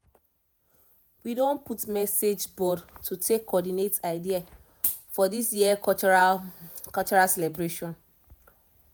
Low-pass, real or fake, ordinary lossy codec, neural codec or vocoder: none; fake; none; vocoder, 48 kHz, 128 mel bands, Vocos